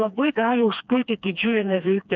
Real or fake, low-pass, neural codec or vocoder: fake; 7.2 kHz; codec, 16 kHz, 2 kbps, FreqCodec, smaller model